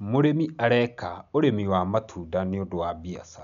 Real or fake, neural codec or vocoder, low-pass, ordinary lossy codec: real; none; 7.2 kHz; none